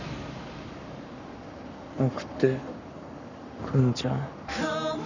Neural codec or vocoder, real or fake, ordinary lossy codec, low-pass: codec, 44.1 kHz, 7.8 kbps, Pupu-Codec; fake; none; 7.2 kHz